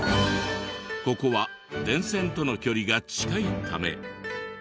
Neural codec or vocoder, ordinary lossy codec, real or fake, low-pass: none; none; real; none